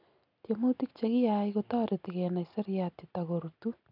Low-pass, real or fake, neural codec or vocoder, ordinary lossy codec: 5.4 kHz; real; none; none